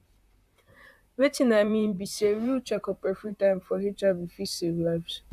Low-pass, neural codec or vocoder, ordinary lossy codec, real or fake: 14.4 kHz; vocoder, 44.1 kHz, 128 mel bands, Pupu-Vocoder; AAC, 96 kbps; fake